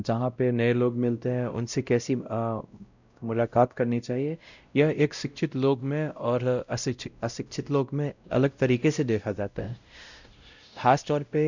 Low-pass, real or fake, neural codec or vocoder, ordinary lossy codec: 7.2 kHz; fake; codec, 16 kHz, 0.5 kbps, X-Codec, WavLM features, trained on Multilingual LibriSpeech; none